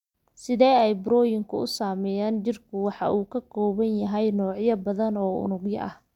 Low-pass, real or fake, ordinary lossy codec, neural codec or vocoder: 19.8 kHz; real; none; none